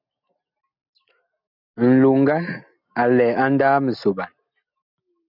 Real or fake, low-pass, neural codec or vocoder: real; 5.4 kHz; none